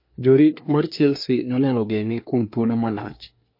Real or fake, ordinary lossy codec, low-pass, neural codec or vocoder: fake; MP3, 32 kbps; 5.4 kHz; codec, 24 kHz, 1 kbps, SNAC